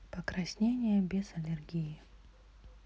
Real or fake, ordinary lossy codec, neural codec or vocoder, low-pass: real; none; none; none